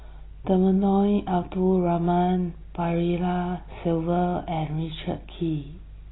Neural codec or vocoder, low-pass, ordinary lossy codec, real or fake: none; 7.2 kHz; AAC, 16 kbps; real